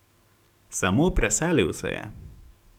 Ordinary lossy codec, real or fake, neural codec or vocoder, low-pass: none; fake; codec, 44.1 kHz, 7.8 kbps, DAC; 19.8 kHz